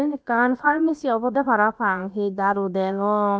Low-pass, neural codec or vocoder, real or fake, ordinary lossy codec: none; codec, 16 kHz, about 1 kbps, DyCAST, with the encoder's durations; fake; none